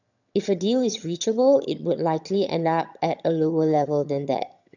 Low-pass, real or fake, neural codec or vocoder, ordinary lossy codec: 7.2 kHz; fake; vocoder, 22.05 kHz, 80 mel bands, HiFi-GAN; none